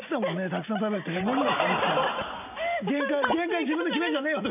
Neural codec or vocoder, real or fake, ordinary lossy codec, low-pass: none; real; none; 3.6 kHz